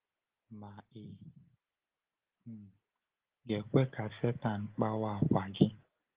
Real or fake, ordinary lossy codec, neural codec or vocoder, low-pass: real; Opus, 16 kbps; none; 3.6 kHz